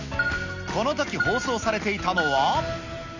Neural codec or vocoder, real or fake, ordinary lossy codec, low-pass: none; real; none; 7.2 kHz